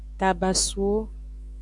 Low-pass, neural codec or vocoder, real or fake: 10.8 kHz; autoencoder, 48 kHz, 128 numbers a frame, DAC-VAE, trained on Japanese speech; fake